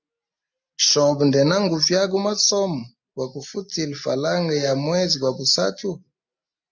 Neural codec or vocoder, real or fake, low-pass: none; real; 7.2 kHz